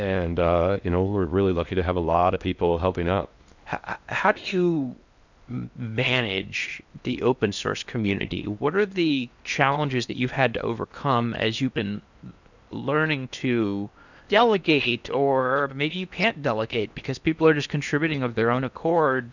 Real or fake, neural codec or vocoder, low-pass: fake; codec, 16 kHz in and 24 kHz out, 0.8 kbps, FocalCodec, streaming, 65536 codes; 7.2 kHz